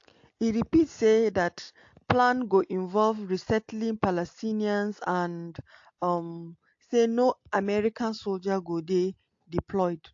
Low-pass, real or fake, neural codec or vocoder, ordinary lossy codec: 7.2 kHz; real; none; AAC, 48 kbps